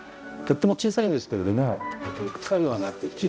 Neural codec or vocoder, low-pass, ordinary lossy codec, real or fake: codec, 16 kHz, 0.5 kbps, X-Codec, HuBERT features, trained on balanced general audio; none; none; fake